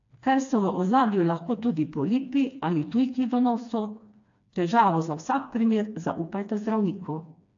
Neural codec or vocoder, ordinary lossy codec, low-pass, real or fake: codec, 16 kHz, 2 kbps, FreqCodec, smaller model; AAC, 48 kbps; 7.2 kHz; fake